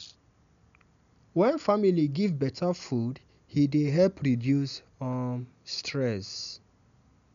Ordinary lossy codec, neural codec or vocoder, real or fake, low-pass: none; none; real; 7.2 kHz